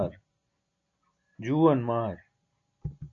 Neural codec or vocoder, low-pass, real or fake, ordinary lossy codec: none; 7.2 kHz; real; AAC, 48 kbps